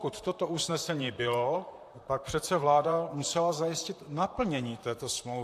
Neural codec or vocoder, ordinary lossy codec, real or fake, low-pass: vocoder, 44.1 kHz, 128 mel bands, Pupu-Vocoder; AAC, 64 kbps; fake; 14.4 kHz